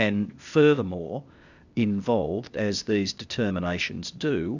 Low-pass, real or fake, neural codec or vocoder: 7.2 kHz; fake; codec, 16 kHz, 0.8 kbps, ZipCodec